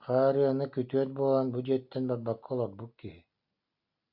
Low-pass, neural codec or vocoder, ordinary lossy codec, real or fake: 5.4 kHz; none; AAC, 48 kbps; real